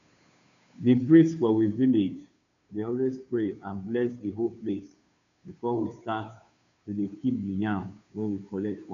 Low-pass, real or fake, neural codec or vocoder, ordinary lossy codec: 7.2 kHz; fake; codec, 16 kHz, 2 kbps, FunCodec, trained on Chinese and English, 25 frames a second; none